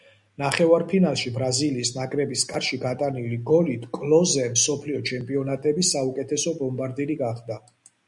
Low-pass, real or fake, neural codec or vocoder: 10.8 kHz; real; none